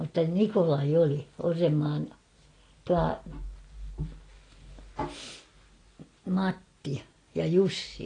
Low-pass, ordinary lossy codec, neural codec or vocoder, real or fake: 9.9 kHz; AAC, 32 kbps; none; real